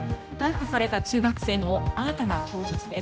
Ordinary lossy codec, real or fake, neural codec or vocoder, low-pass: none; fake; codec, 16 kHz, 1 kbps, X-Codec, HuBERT features, trained on general audio; none